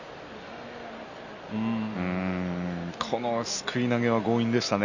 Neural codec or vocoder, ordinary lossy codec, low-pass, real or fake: none; none; 7.2 kHz; real